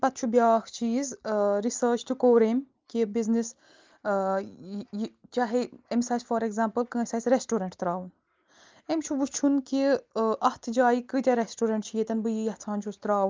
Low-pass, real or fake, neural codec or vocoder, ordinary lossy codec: 7.2 kHz; real; none; Opus, 24 kbps